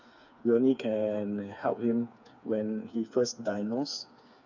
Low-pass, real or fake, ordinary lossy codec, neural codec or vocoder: 7.2 kHz; fake; none; codec, 16 kHz, 4 kbps, FreqCodec, smaller model